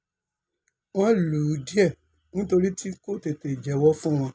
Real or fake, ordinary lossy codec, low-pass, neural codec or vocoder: real; none; none; none